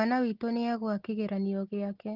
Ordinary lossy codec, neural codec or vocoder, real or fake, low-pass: Opus, 16 kbps; none; real; 5.4 kHz